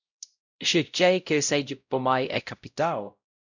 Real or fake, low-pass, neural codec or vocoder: fake; 7.2 kHz; codec, 16 kHz, 0.5 kbps, X-Codec, WavLM features, trained on Multilingual LibriSpeech